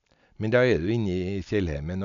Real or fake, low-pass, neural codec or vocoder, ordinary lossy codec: real; 7.2 kHz; none; none